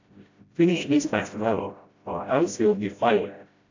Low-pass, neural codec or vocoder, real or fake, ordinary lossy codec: 7.2 kHz; codec, 16 kHz, 0.5 kbps, FreqCodec, smaller model; fake; AAC, 48 kbps